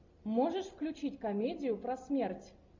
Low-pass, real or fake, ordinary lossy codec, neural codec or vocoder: 7.2 kHz; real; MP3, 64 kbps; none